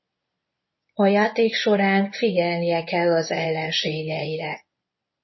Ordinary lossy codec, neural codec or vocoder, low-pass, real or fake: MP3, 24 kbps; codec, 24 kHz, 0.9 kbps, WavTokenizer, medium speech release version 1; 7.2 kHz; fake